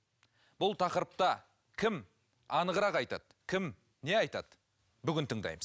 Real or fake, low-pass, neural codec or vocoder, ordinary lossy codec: real; none; none; none